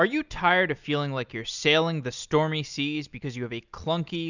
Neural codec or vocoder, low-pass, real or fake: none; 7.2 kHz; real